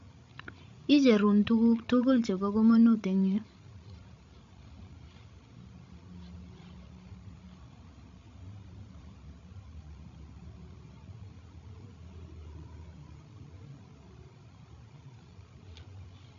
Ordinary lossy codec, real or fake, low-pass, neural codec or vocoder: MP3, 48 kbps; fake; 7.2 kHz; codec, 16 kHz, 16 kbps, FreqCodec, larger model